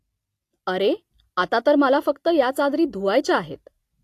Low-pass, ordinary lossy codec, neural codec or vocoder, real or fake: 14.4 kHz; AAC, 64 kbps; none; real